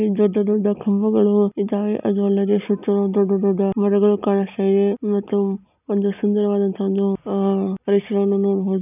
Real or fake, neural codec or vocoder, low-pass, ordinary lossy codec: real; none; 3.6 kHz; none